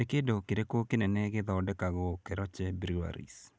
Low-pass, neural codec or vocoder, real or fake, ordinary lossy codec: none; none; real; none